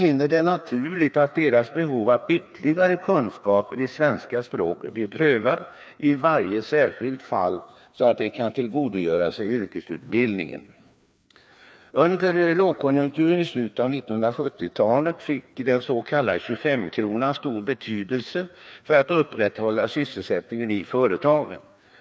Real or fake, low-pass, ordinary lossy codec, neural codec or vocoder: fake; none; none; codec, 16 kHz, 2 kbps, FreqCodec, larger model